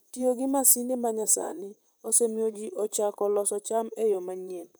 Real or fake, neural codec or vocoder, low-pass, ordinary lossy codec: fake; vocoder, 44.1 kHz, 128 mel bands, Pupu-Vocoder; none; none